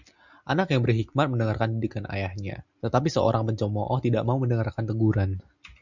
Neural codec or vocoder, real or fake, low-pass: none; real; 7.2 kHz